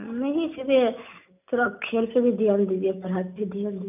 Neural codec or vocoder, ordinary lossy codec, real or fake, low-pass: none; none; real; 3.6 kHz